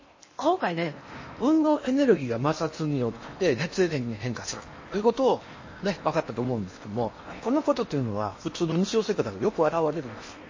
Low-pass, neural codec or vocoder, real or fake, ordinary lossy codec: 7.2 kHz; codec, 16 kHz in and 24 kHz out, 0.8 kbps, FocalCodec, streaming, 65536 codes; fake; MP3, 32 kbps